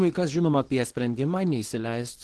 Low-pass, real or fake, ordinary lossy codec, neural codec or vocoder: 10.8 kHz; fake; Opus, 16 kbps; codec, 24 kHz, 0.9 kbps, WavTokenizer, small release